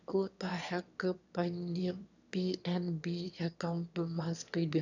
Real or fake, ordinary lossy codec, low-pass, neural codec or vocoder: fake; none; 7.2 kHz; autoencoder, 22.05 kHz, a latent of 192 numbers a frame, VITS, trained on one speaker